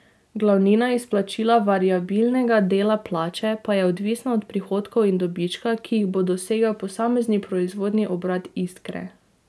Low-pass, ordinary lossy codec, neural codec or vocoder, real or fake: none; none; none; real